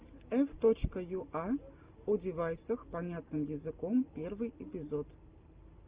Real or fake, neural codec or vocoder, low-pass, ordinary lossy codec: real; none; 3.6 kHz; Opus, 24 kbps